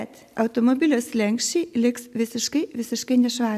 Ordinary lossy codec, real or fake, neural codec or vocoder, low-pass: AAC, 96 kbps; real; none; 14.4 kHz